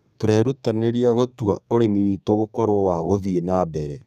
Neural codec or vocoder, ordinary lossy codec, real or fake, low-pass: codec, 32 kHz, 1.9 kbps, SNAC; none; fake; 14.4 kHz